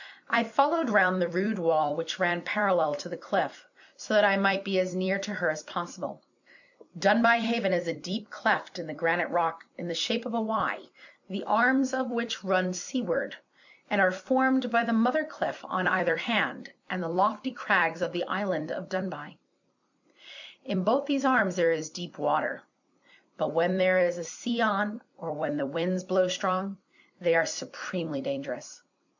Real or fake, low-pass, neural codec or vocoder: fake; 7.2 kHz; vocoder, 44.1 kHz, 128 mel bands every 256 samples, BigVGAN v2